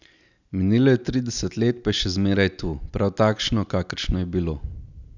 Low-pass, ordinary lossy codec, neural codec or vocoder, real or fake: 7.2 kHz; none; none; real